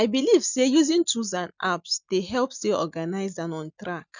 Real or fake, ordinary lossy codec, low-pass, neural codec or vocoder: real; none; 7.2 kHz; none